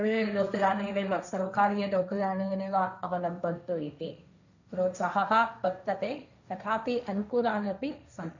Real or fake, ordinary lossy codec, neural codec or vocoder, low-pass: fake; none; codec, 16 kHz, 1.1 kbps, Voila-Tokenizer; 7.2 kHz